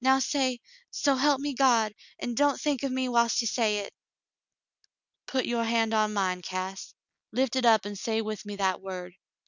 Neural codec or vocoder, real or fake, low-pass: none; real; 7.2 kHz